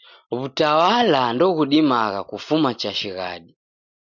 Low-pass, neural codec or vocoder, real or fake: 7.2 kHz; none; real